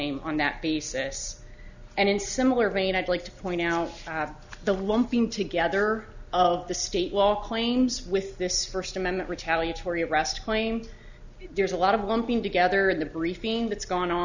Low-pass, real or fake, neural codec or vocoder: 7.2 kHz; real; none